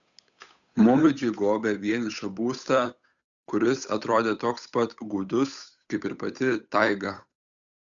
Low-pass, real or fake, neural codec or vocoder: 7.2 kHz; fake; codec, 16 kHz, 8 kbps, FunCodec, trained on Chinese and English, 25 frames a second